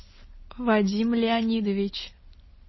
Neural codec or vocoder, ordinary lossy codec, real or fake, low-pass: none; MP3, 24 kbps; real; 7.2 kHz